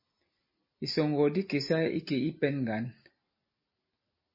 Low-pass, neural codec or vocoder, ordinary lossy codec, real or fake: 5.4 kHz; none; MP3, 24 kbps; real